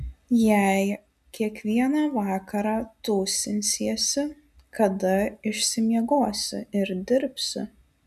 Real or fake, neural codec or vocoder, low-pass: real; none; 14.4 kHz